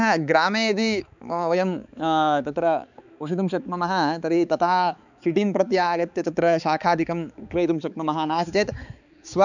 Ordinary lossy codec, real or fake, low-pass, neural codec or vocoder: none; fake; 7.2 kHz; codec, 16 kHz, 4 kbps, X-Codec, HuBERT features, trained on balanced general audio